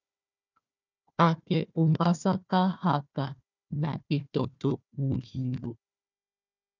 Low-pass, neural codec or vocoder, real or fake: 7.2 kHz; codec, 16 kHz, 1 kbps, FunCodec, trained on Chinese and English, 50 frames a second; fake